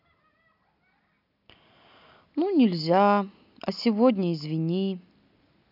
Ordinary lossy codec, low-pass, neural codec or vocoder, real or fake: none; 5.4 kHz; none; real